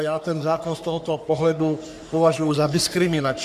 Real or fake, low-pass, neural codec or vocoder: fake; 14.4 kHz; codec, 44.1 kHz, 3.4 kbps, Pupu-Codec